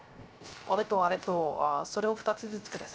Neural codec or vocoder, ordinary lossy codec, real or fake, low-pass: codec, 16 kHz, 0.3 kbps, FocalCodec; none; fake; none